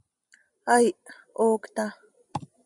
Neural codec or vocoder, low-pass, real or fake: none; 10.8 kHz; real